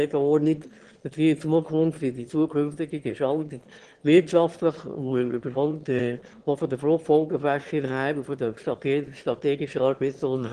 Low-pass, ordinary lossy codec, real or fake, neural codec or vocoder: 9.9 kHz; Opus, 16 kbps; fake; autoencoder, 22.05 kHz, a latent of 192 numbers a frame, VITS, trained on one speaker